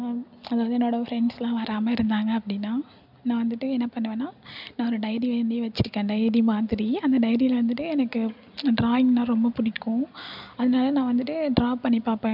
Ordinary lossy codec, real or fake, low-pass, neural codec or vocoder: none; real; 5.4 kHz; none